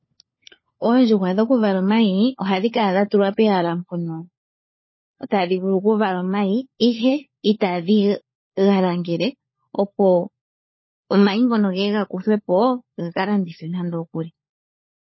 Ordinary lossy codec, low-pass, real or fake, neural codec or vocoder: MP3, 24 kbps; 7.2 kHz; fake; codec, 16 kHz, 4 kbps, FunCodec, trained on LibriTTS, 50 frames a second